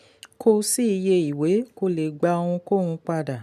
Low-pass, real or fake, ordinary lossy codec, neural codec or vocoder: 14.4 kHz; real; none; none